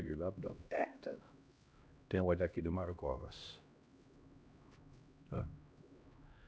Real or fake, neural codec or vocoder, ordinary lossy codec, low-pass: fake; codec, 16 kHz, 1 kbps, X-Codec, HuBERT features, trained on LibriSpeech; none; none